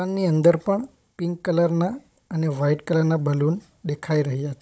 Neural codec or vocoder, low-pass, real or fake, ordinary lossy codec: codec, 16 kHz, 16 kbps, FunCodec, trained on Chinese and English, 50 frames a second; none; fake; none